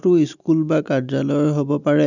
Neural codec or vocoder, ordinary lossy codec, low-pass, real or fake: none; none; 7.2 kHz; real